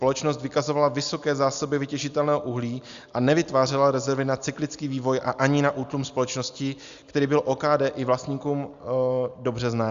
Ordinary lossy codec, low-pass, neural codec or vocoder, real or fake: Opus, 64 kbps; 7.2 kHz; none; real